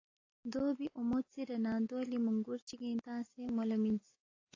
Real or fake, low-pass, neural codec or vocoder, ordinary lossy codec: real; 7.2 kHz; none; AAC, 32 kbps